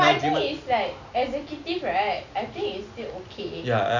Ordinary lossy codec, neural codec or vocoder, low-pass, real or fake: none; none; 7.2 kHz; real